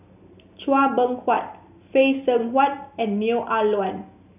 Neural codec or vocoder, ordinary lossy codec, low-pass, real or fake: none; none; 3.6 kHz; real